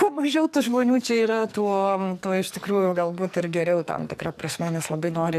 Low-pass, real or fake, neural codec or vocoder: 14.4 kHz; fake; codec, 44.1 kHz, 2.6 kbps, SNAC